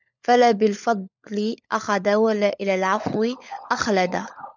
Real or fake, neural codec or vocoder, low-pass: fake; codec, 16 kHz, 16 kbps, FunCodec, trained on LibriTTS, 50 frames a second; 7.2 kHz